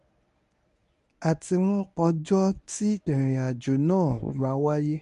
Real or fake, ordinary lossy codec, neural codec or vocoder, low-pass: fake; MP3, 64 kbps; codec, 24 kHz, 0.9 kbps, WavTokenizer, medium speech release version 1; 10.8 kHz